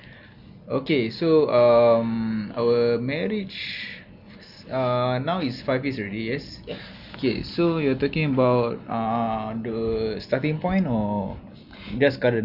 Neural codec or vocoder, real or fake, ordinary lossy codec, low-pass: none; real; Opus, 64 kbps; 5.4 kHz